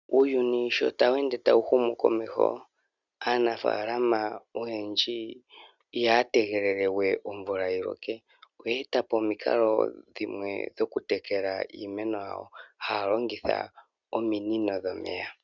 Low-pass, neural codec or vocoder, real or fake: 7.2 kHz; none; real